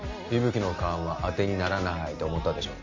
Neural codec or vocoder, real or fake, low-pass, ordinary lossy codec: none; real; 7.2 kHz; MP3, 32 kbps